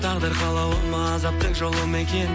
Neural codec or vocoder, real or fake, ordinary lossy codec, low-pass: none; real; none; none